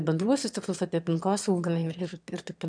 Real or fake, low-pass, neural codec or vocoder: fake; 9.9 kHz; autoencoder, 22.05 kHz, a latent of 192 numbers a frame, VITS, trained on one speaker